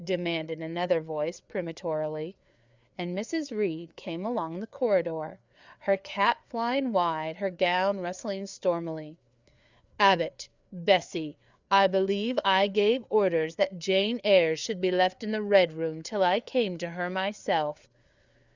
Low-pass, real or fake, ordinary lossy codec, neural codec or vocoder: 7.2 kHz; fake; Opus, 64 kbps; codec, 16 kHz, 4 kbps, FreqCodec, larger model